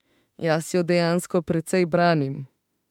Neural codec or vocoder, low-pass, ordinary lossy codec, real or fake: autoencoder, 48 kHz, 32 numbers a frame, DAC-VAE, trained on Japanese speech; 19.8 kHz; MP3, 96 kbps; fake